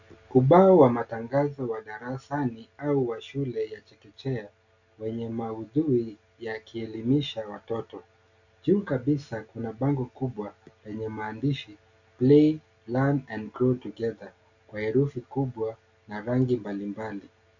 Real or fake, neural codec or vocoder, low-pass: real; none; 7.2 kHz